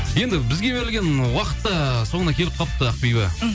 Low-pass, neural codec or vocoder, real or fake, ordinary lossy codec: none; none; real; none